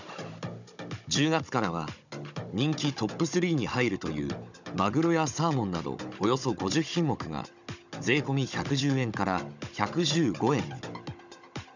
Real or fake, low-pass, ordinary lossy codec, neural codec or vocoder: fake; 7.2 kHz; none; codec, 16 kHz, 16 kbps, FunCodec, trained on Chinese and English, 50 frames a second